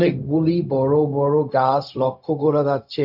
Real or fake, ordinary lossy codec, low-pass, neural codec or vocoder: fake; none; 5.4 kHz; codec, 16 kHz, 0.4 kbps, LongCat-Audio-Codec